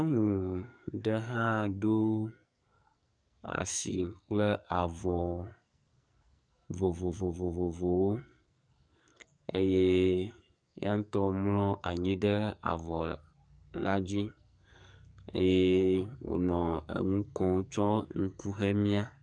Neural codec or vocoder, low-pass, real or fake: codec, 44.1 kHz, 2.6 kbps, SNAC; 9.9 kHz; fake